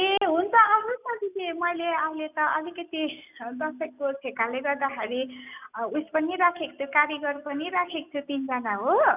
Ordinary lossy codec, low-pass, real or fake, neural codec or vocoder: none; 3.6 kHz; real; none